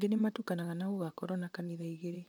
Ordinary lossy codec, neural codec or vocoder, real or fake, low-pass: none; vocoder, 44.1 kHz, 128 mel bands, Pupu-Vocoder; fake; 19.8 kHz